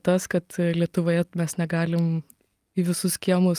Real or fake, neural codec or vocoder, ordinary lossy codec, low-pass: real; none; Opus, 32 kbps; 14.4 kHz